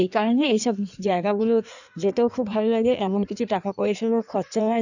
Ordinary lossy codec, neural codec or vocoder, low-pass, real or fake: none; codec, 16 kHz in and 24 kHz out, 1.1 kbps, FireRedTTS-2 codec; 7.2 kHz; fake